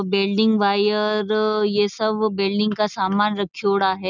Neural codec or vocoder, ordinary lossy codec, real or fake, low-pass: none; none; real; 7.2 kHz